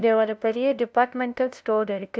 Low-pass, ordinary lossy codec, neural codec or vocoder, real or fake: none; none; codec, 16 kHz, 0.5 kbps, FunCodec, trained on LibriTTS, 25 frames a second; fake